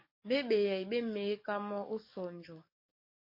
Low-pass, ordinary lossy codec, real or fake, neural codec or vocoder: 5.4 kHz; MP3, 32 kbps; fake; codec, 44.1 kHz, 7.8 kbps, DAC